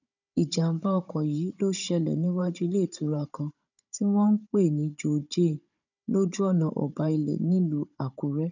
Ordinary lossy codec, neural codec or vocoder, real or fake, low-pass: none; codec, 16 kHz, 16 kbps, FunCodec, trained on Chinese and English, 50 frames a second; fake; 7.2 kHz